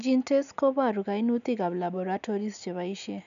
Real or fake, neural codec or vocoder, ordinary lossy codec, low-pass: real; none; none; 7.2 kHz